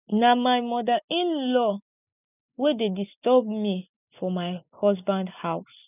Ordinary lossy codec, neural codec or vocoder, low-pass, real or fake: none; none; 3.6 kHz; real